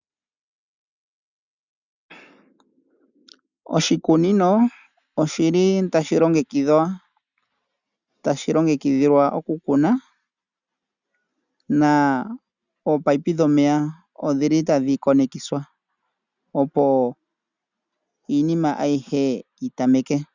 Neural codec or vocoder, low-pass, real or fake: none; 7.2 kHz; real